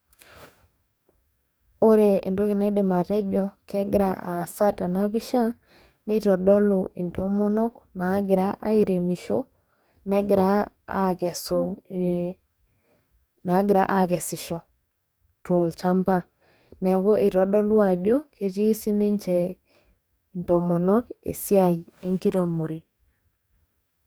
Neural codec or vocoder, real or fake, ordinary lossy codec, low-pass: codec, 44.1 kHz, 2.6 kbps, DAC; fake; none; none